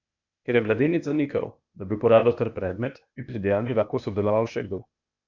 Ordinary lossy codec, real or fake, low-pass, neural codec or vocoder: none; fake; 7.2 kHz; codec, 16 kHz, 0.8 kbps, ZipCodec